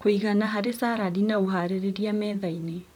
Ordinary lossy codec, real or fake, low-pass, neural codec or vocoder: none; fake; 19.8 kHz; vocoder, 44.1 kHz, 128 mel bands, Pupu-Vocoder